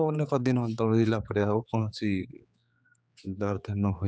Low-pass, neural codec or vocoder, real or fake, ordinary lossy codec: none; codec, 16 kHz, 4 kbps, X-Codec, HuBERT features, trained on general audio; fake; none